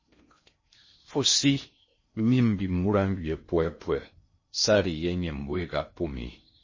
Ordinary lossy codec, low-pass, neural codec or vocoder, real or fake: MP3, 32 kbps; 7.2 kHz; codec, 16 kHz in and 24 kHz out, 0.6 kbps, FocalCodec, streaming, 4096 codes; fake